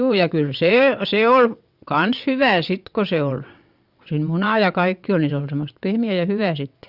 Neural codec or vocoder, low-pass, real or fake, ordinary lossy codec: vocoder, 22.05 kHz, 80 mel bands, WaveNeXt; 5.4 kHz; fake; Opus, 64 kbps